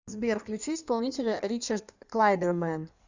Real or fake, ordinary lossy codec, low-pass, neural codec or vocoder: fake; Opus, 64 kbps; 7.2 kHz; codec, 16 kHz in and 24 kHz out, 1.1 kbps, FireRedTTS-2 codec